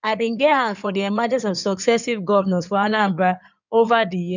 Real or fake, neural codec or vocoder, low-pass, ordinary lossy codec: fake; codec, 16 kHz in and 24 kHz out, 2.2 kbps, FireRedTTS-2 codec; 7.2 kHz; none